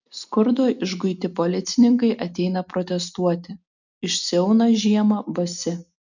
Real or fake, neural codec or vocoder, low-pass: real; none; 7.2 kHz